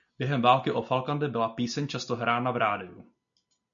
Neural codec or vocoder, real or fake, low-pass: none; real; 7.2 kHz